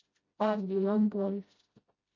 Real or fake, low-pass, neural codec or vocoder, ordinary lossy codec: fake; 7.2 kHz; codec, 16 kHz, 0.5 kbps, FreqCodec, smaller model; MP3, 32 kbps